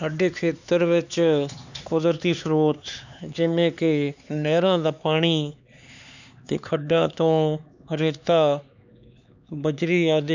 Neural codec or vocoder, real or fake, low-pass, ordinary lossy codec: codec, 16 kHz, 4 kbps, X-Codec, HuBERT features, trained on LibriSpeech; fake; 7.2 kHz; none